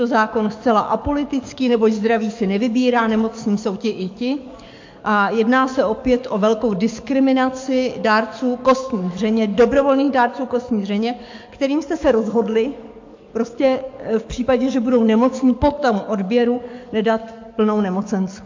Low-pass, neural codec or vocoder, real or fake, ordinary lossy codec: 7.2 kHz; codec, 44.1 kHz, 7.8 kbps, DAC; fake; MP3, 48 kbps